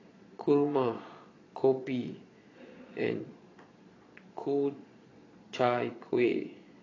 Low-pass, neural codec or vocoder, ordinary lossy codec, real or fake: 7.2 kHz; vocoder, 22.05 kHz, 80 mel bands, Vocos; AAC, 32 kbps; fake